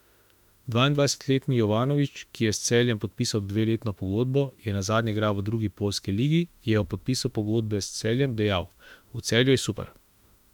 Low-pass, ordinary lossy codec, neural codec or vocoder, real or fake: 19.8 kHz; none; autoencoder, 48 kHz, 32 numbers a frame, DAC-VAE, trained on Japanese speech; fake